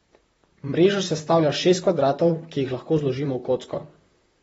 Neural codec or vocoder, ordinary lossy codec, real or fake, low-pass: vocoder, 44.1 kHz, 128 mel bands, Pupu-Vocoder; AAC, 24 kbps; fake; 19.8 kHz